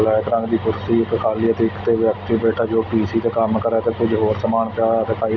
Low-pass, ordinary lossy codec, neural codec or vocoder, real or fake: 7.2 kHz; none; none; real